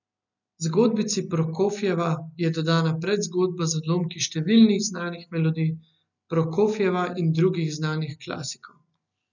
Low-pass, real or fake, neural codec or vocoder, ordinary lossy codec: 7.2 kHz; real; none; none